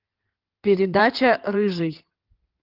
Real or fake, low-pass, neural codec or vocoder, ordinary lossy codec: fake; 5.4 kHz; codec, 16 kHz in and 24 kHz out, 2.2 kbps, FireRedTTS-2 codec; Opus, 32 kbps